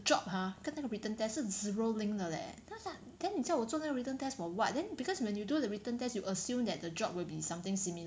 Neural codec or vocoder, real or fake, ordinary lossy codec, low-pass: none; real; none; none